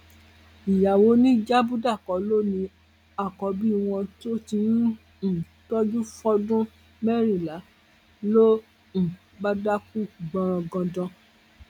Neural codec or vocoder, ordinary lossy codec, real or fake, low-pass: none; none; real; 19.8 kHz